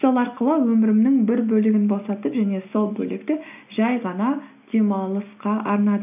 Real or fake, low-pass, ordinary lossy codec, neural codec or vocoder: real; 3.6 kHz; none; none